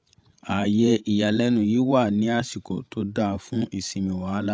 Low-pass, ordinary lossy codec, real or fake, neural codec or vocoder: none; none; fake; codec, 16 kHz, 16 kbps, FreqCodec, larger model